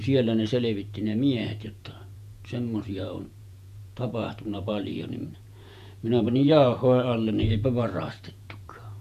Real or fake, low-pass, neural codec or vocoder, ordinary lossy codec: fake; 14.4 kHz; vocoder, 48 kHz, 128 mel bands, Vocos; none